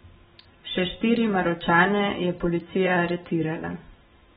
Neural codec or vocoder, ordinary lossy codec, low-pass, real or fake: vocoder, 44.1 kHz, 128 mel bands every 512 samples, BigVGAN v2; AAC, 16 kbps; 19.8 kHz; fake